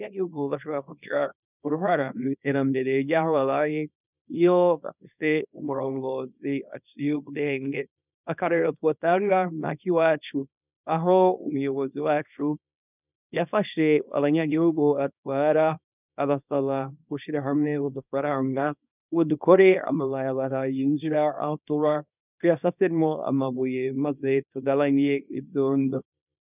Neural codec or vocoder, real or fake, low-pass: codec, 24 kHz, 0.9 kbps, WavTokenizer, small release; fake; 3.6 kHz